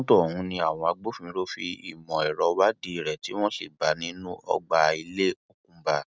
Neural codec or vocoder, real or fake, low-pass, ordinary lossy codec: none; real; none; none